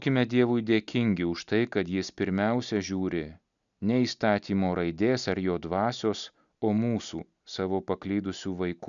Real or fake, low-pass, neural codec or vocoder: real; 7.2 kHz; none